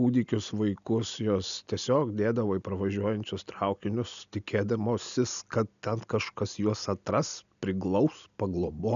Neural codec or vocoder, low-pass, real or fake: none; 7.2 kHz; real